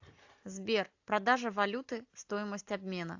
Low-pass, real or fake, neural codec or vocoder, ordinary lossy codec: 7.2 kHz; real; none; AAC, 48 kbps